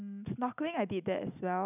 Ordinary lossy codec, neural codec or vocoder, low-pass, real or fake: none; none; 3.6 kHz; real